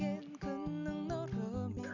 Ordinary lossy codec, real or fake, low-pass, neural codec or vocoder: none; real; 7.2 kHz; none